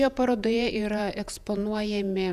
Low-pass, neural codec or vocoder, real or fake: 14.4 kHz; vocoder, 48 kHz, 128 mel bands, Vocos; fake